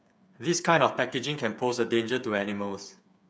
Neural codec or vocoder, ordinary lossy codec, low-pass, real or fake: codec, 16 kHz, 8 kbps, FreqCodec, smaller model; none; none; fake